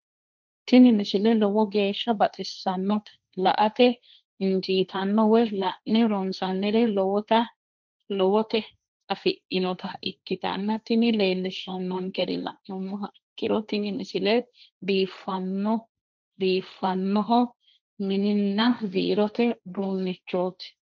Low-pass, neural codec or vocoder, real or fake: 7.2 kHz; codec, 16 kHz, 1.1 kbps, Voila-Tokenizer; fake